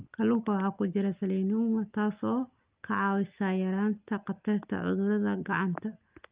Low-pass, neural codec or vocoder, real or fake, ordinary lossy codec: 3.6 kHz; none; real; Opus, 24 kbps